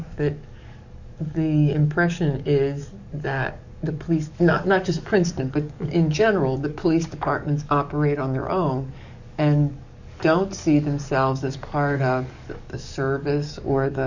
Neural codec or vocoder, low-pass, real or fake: codec, 44.1 kHz, 7.8 kbps, DAC; 7.2 kHz; fake